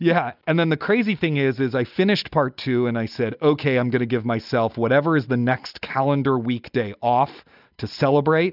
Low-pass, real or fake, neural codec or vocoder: 5.4 kHz; real; none